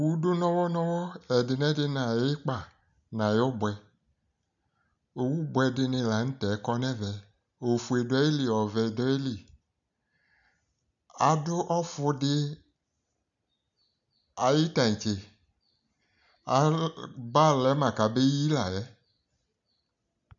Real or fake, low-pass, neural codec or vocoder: real; 7.2 kHz; none